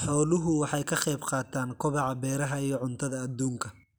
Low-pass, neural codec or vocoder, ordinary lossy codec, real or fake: none; none; none; real